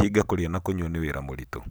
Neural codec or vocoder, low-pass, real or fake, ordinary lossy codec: none; none; real; none